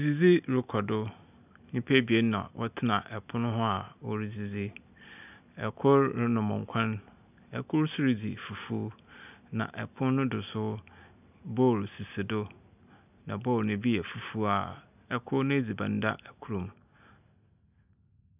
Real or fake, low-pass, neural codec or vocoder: real; 3.6 kHz; none